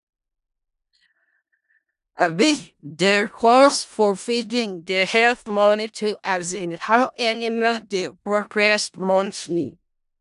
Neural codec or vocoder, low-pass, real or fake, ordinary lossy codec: codec, 16 kHz in and 24 kHz out, 0.4 kbps, LongCat-Audio-Codec, four codebook decoder; 10.8 kHz; fake; none